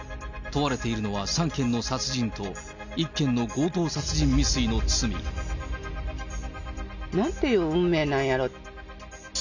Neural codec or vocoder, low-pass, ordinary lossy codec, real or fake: none; 7.2 kHz; none; real